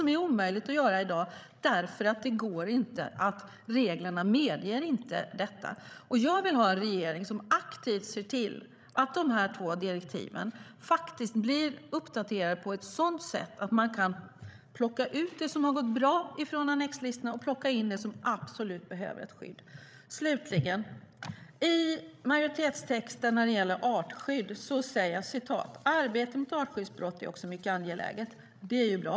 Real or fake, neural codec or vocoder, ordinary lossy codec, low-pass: fake; codec, 16 kHz, 16 kbps, FreqCodec, larger model; none; none